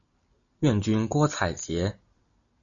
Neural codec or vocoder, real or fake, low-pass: none; real; 7.2 kHz